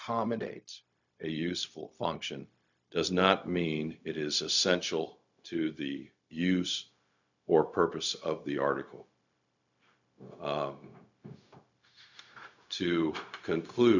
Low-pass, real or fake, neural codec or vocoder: 7.2 kHz; fake; codec, 16 kHz, 0.4 kbps, LongCat-Audio-Codec